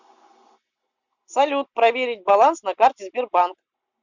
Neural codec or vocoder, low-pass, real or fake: none; 7.2 kHz; real